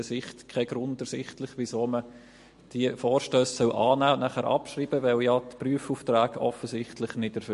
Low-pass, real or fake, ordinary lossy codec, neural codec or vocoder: 14.4 kHz; real; MP3, 48 kbps; none